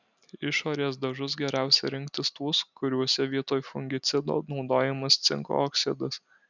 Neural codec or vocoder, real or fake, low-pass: none; real; 7.2 kHz